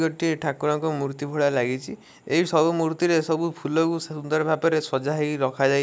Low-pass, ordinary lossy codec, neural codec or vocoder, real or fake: none; none; none; real